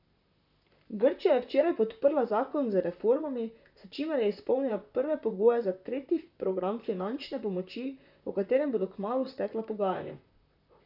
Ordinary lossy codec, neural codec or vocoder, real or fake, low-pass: none; vocoder, 44.1 kHz, 128 mel bands, Pupu-Vocoder; fake; 5.4 kHz